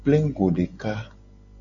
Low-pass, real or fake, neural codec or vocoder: 7.2 kHz; real; none